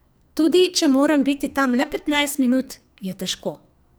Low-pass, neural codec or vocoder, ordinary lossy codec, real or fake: none; codec, 44.1 kHz, 2.6 kbps, SNAC; none; fake